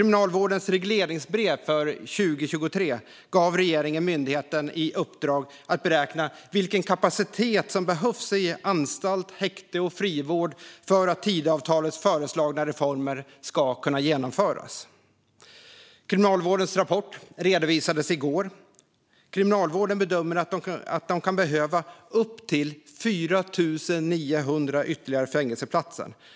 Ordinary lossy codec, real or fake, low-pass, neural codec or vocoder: none; real; none; none